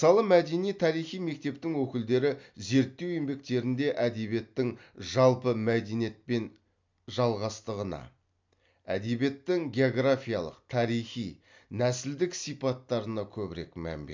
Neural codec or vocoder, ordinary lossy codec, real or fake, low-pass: none; MP3, 64 kbps; real; 7.2 kHz